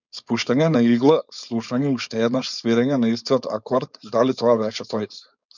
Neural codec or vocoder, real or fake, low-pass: codec, 16 kHz, 4.8 kbps, FACodec; fake; 7.2 kHz